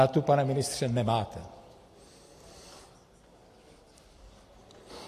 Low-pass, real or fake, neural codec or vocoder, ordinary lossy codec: 14.4 kHz; real; none; AAC, 48 kbps